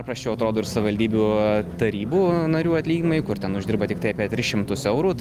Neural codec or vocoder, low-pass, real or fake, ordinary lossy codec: none; 14.4 kHz; real; Opus, 24 kbps